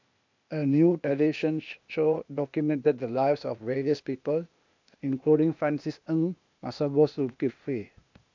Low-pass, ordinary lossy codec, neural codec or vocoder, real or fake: 7.2 kHz; MP3, 64 kbps; codec, 16 kHz, 0.8 kbps, ZipCodec; fake